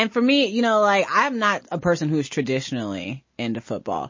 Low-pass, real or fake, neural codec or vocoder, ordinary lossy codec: 7.2 kHz; real; none; MP3, 32 kbps